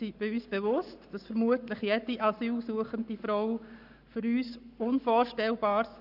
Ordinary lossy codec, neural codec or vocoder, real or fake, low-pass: none; codec, 44.1 kHz, 7.8 kbps, Pupu-Codec; fake; 5.4 kHz